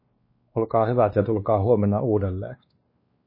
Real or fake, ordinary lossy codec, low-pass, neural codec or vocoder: fake; MP3, 24 kbps; 5.4 kHz; codec, 16 kHz, 2 kbps, X-Codec, WavLM features, trained on Multilingual LibriSpeech